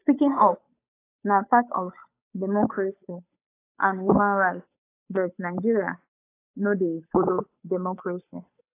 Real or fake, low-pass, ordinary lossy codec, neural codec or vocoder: fake; 3.6 kHz; AAC, 24 kbps; codec, 16 kHz, 8 kbps, FunCodec, trained on Chinese and English, 25 frames a second